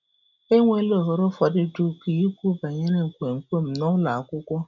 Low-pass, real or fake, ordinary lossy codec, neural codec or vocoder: 7.2 kHz; real; none; none